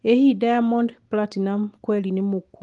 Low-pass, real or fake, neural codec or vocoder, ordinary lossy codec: 10.8 kHz; real; none; Opus, 24 kbps